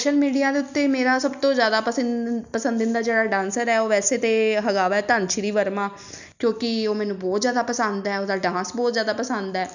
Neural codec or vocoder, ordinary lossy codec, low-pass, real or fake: none; none; 7.2 kHz; real